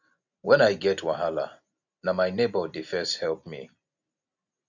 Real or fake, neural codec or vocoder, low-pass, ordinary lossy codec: real; none; 7.2 kHz; none